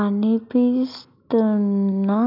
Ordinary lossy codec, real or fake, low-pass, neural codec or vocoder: none; real; 5.4 kHz; none